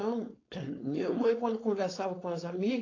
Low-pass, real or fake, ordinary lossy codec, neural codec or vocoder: 7.2 kHz; fake; AAC, 32 kbps; codec, 16 kHz, 4.8 kbps, FACodec